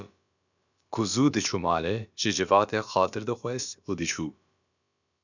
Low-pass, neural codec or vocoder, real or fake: 7.2 kHz; codec, 16 kHz, about 1 kbps, DyCAST, with the encoder's durations; fake